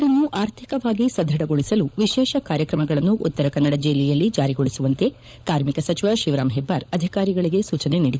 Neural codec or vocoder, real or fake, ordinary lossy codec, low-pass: codec, 16 kHz, 16 kbps, FunCodec, trained on LibriTTS, 50 frames a second; fake; none; none